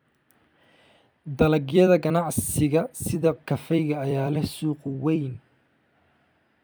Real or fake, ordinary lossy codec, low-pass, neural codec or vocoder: fake; none; none; vocoder, 44.1 kHz, 128 mel bands every 256 samples, BigVGAN v2